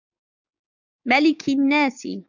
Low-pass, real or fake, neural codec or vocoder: 7.2 kHz; fake; codec, 16 kHz, 6 kbps, DAC